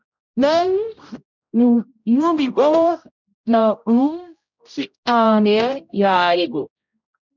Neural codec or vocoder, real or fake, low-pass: codec, 16 kHz, 0.5 kbps, X-Codec, HuBERT features, trained on general audio; fake; 7.2 kHz